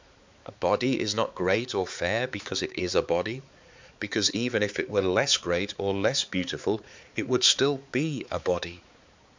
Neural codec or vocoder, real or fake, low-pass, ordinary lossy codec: codec, 16 kHz, 4 kbps, X-Codec, HuBERT features, trained on balanced general audio; fake; 7.2 kHz; MP3, 64 kbps